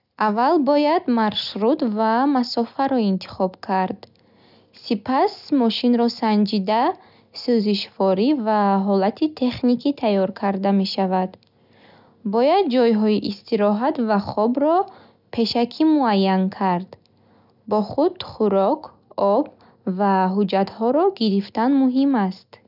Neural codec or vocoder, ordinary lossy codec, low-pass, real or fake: none; none; 5.4 kHz; real